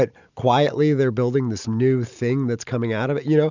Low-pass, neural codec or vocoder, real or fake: 7.2 kHz; none; real